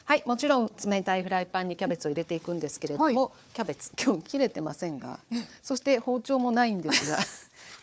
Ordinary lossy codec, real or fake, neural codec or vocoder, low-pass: none; fake; codec, 16 kHz, 4 kbps, FunCodec, trained on Chinese and English, 50 frames a second; none